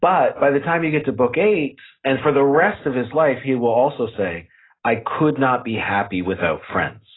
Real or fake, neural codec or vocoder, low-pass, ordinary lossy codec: real; none; 7.2 kHz; AAC, 16 kbps